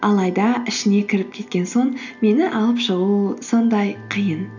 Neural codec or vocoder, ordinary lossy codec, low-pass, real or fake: none; none; 7.2 kHz; real